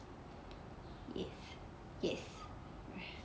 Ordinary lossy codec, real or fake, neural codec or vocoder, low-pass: none; real; none; none